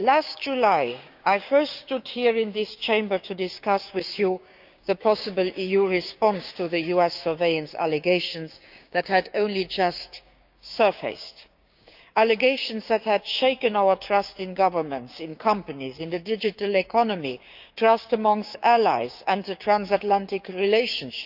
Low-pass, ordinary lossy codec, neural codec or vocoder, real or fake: 5.4 kHz; none; codec, 16 kHz, 6 kbps, DAC; fake